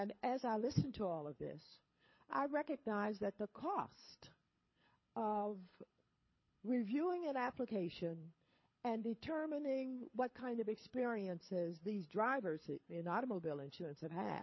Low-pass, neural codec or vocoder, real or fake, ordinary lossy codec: 7.2 kHz; codec, 24 kHz, 6 kbps, HILCodec; fake; MP3, 24 kbps